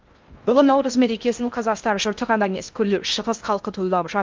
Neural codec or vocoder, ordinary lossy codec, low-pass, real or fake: codec, 16 kHz in and 24 kHz out, 0.6 kbps, FocalCodec, streaming, 4096 codes; Opus, 24 kbps; 7.2 kHz; fake